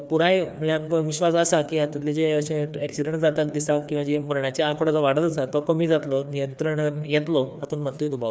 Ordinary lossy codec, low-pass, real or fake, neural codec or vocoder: none; none; fake; codec, 16 kHz, 2 kbps, FreqCodec, larger model